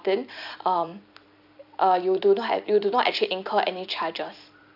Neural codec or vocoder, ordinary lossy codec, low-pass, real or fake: vocoder, 44.1 kHz, 128 mel bands every 256 samples, BigVGAN v2; none; 5.4 kHz; fake